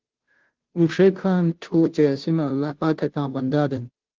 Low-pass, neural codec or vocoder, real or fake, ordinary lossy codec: 7.2 kHz; codec, 16 kHz, 0.5 kbps, FunCodec, trained on Chinese and English, 25 frames a second; fake; Opus, 16 kbps